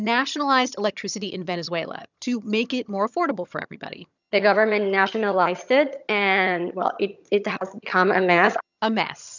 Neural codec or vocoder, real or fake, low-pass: vocoder, 22.05 kHz, 80 mel bands, HiFi-GAN; fake; 7.2 kHz